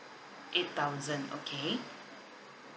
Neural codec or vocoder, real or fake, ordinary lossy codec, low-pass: none; real; none; none